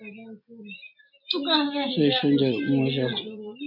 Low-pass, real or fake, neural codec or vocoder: 5.4 kHz; real; none